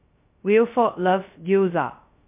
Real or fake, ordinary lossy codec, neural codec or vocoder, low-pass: fake; MP3, 32 kbps; codec, 16 kHz, 0.2 kbps, FocalCodec; 3.6 kHz